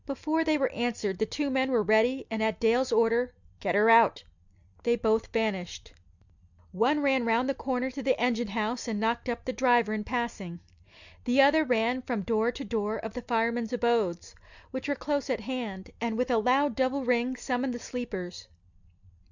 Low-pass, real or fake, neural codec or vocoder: 7.2 kHz; real; none